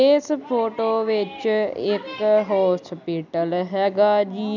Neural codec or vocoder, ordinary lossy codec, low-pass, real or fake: none; none; 7.2 kHz; real